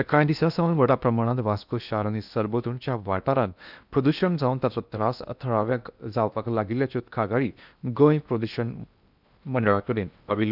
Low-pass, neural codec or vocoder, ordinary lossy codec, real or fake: 5.4 kHz; codec, 16 kHz in and 24 kHz out, 0.8 kbps, FocalCodec, streaming, 65536 codes; none; fake